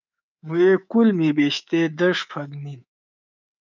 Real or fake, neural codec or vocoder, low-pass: fake; codec, 24 kHz, 3.1 kbps, DualCodec; 7.2 kHz